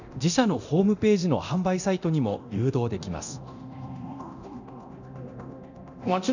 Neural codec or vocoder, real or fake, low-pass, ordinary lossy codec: codec, 24 kHz, 0.9 kbps, DualCodec; fake; 7.2 kHz; none